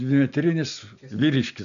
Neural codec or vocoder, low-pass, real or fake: none; 7.2 kHz; real